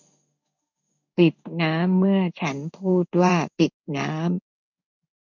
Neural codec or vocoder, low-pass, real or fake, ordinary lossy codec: codec, 16 kHz in and 24 kHz out, 1 kbps, XY-Tokenizer; 7.2 kHz; fake; none